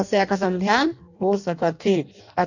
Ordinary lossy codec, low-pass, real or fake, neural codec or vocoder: none; 7.2 kHz; fake; codec, 16 kHz in and 24 kHz out, 0.6 kbps, FireRedTTS-2 codec